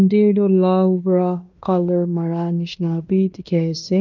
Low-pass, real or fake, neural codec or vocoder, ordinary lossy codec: 7.2 kHz; fake; codec, 16 kHz in and 24 kHz out, 0.9 kbps, LongCat-Audio-Codec, fine tuned four codebook decoder; none